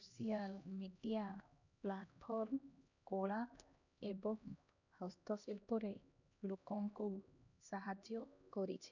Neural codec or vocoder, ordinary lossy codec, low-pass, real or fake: codec, 16 kHz, 1 kbps, X-Codec, HuBERT features, trained on LibriSpeech; none; 7.2 kHz; fake